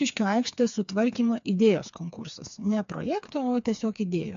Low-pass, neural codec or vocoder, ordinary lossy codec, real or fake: 7.2 kHz; codec, 16 kHz, 4 kbps, FreqCodec, smaller model; AAC, 64 kbps; fake